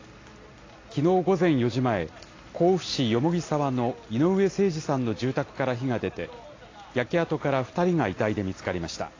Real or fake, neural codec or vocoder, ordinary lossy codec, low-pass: real; none; AAC, 32 kbps; 7.2 kHz